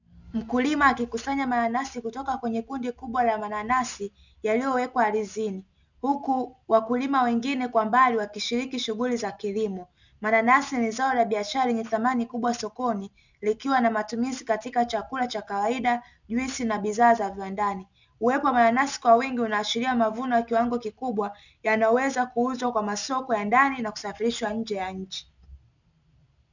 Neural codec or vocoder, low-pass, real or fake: none; 7.2 kHz; real